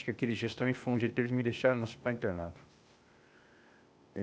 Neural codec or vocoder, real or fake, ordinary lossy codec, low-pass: codec, 16 kHz, 0.8 kbps, ZipCodec; fake; none; none